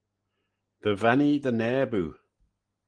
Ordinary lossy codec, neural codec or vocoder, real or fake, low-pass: Opus, 24 kbps; none; real; 9.9 kHz